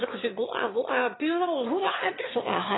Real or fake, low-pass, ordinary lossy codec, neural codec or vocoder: fake; 7.2 kHz; AAC, 16 kbps; autoencoder, 22.05 kHz, a latent of 192 numbers a frame, VITS, trained on one speaker